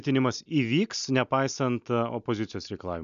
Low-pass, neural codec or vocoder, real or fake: 7.2 kHz; none; real